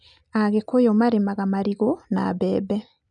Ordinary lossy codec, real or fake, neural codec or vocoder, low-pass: none; real; none; 9.9 kHz